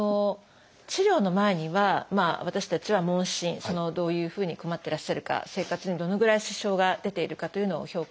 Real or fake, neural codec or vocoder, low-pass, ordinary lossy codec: real; none; none; none